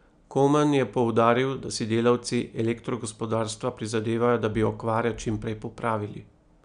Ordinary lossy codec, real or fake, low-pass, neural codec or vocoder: none; real; 9.9 kHz; none